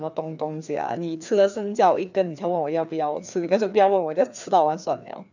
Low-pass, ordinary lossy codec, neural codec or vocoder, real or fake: 7.2 kHz; none; codec, 16 kHz, 2 kbps, FreqCodec, larger model; fake